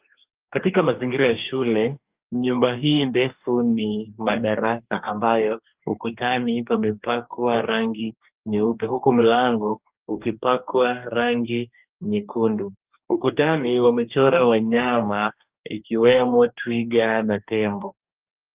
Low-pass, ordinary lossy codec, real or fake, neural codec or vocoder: 3.6 kHz; Opus, 24 kbps; fake; codec, 44.1 kHz, 2.6 kbps, DAC